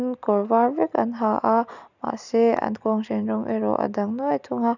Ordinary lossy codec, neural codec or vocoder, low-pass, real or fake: none; none; 7.2 kHz; real